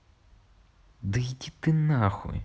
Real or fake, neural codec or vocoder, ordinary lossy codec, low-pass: real; none; none; none